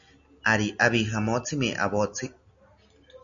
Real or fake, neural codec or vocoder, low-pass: real; none; 7.2 kHz